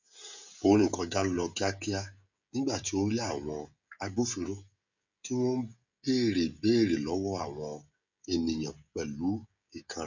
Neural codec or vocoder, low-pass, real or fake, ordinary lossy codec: codec, 16 kHz, 16 kbps, FreqCodec, smaller model; 7.2 kHz; fake; none